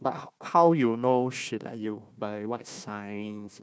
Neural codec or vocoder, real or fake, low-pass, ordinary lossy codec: codec, 16 kHz, 1 kbps, FunCodec, trained on Chinese and English, 50 frames a second; fake; none; none